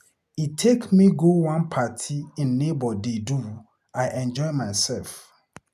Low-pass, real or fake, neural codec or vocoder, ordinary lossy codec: 14.4 kHz; real; none; none